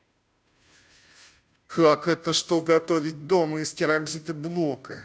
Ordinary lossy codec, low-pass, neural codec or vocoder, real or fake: none; none; codec, 16 kHz, 0.5 kbps, FunCodec, trained on Chinese and English, 25 frames a second; fake